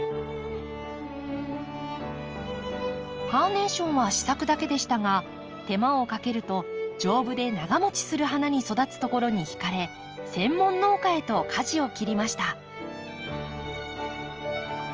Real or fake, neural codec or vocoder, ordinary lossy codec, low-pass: real; none; Opus, 24 kbps; 7.2 kHz